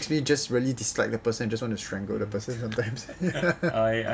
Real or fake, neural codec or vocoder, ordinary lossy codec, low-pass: real; none; none; none